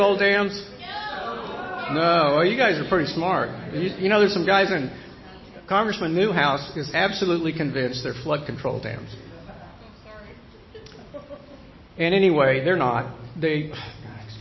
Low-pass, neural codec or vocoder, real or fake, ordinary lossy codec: 7.2 kHz; none; real; MP3, 24 kbps